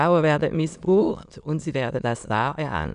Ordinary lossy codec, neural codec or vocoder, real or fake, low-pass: none; autoencoder, 22.05 kHz, a latent of 192 numbers a frame, VITS, trained on many speakers; fake; 9.9 kHz